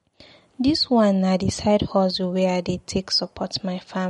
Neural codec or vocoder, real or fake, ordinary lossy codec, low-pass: none; real; MP3, 48 kbps; 19.8 kHz